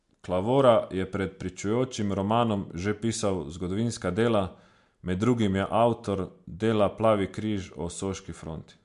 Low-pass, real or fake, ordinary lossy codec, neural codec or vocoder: 10.8 kHz; real; MP3, 64 kbps; none